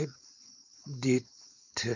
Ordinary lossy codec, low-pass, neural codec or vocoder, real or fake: none; 7.2 kHz; codec, 16 kHz, 4.8 kbps, FACodec; fake